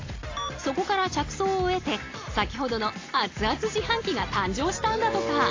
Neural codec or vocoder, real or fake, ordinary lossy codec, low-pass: none; real; AAC, 32 kbps; 7.2 kHz